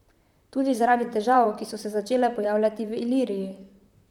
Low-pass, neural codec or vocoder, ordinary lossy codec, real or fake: 19.8 kHz; vocoder, 44.1 kHz, 128 mel bands, Pupu-Vocoder; none; fake